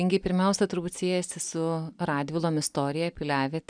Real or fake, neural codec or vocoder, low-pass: real; none; 9.9 kHz